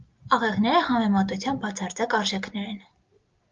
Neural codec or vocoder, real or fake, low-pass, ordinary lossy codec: none; real; 7.2 kHz; Opus, 32 kbps